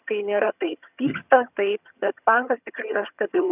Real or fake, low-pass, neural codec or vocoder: fake; 3.6 kHz; vocoder, 22.05 kHz, 80 mel bands, HiFi-GAN